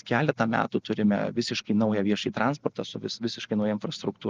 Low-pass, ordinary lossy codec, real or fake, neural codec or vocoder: 7.2 kHz; Opus, 24 kbps; real; none